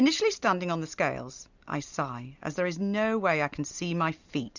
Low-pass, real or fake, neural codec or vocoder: 7.2 kHz; real; none